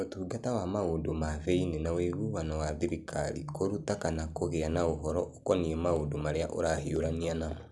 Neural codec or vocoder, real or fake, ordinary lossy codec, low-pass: none; real; none; none